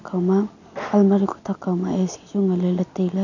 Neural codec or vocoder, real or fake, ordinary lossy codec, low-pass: none; real; none; 7.2 kHz